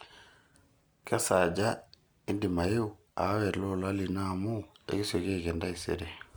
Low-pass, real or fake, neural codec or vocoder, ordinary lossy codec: none; real; none; none